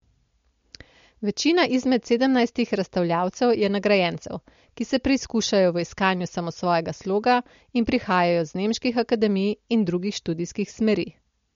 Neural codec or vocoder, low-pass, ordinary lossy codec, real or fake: none; 7.2 kHz; MP3, 48 kbps; real